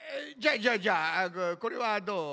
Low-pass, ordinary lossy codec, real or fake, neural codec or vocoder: none; none; real; none